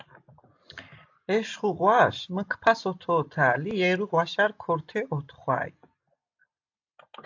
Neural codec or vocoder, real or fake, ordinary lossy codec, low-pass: none; real; AAC, 48 kbps; 7.2 kHz